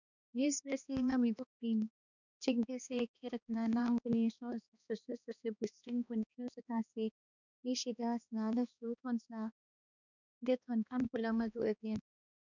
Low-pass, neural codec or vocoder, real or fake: 7.2 kHz; codec, 16 kHz, 2 kbps, X-Codec, HuBERT features, trained on balanced general audio; fake